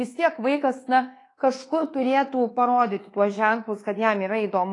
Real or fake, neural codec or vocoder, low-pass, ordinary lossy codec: fake; codec, 24 kHz, 1.2 kbps, DualCodec; 10.8 kHz; AAC, 32 kbps